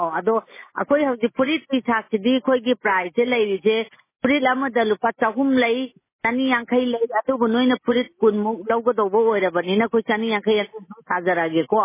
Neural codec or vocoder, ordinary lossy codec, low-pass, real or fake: none; MP3, 16 kbps; 3.6 kHz; real